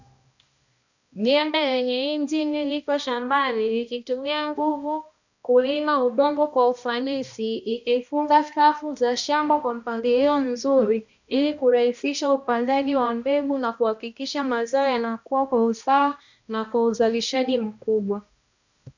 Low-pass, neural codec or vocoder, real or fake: 7.2 kHz; codec, 16 kHz, 1 kbps, X-Codec, HuBERT features, trained on balanced general audio; fake